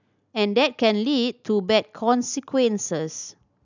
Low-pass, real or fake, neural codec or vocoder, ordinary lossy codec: 7.2 kHz; real; none; none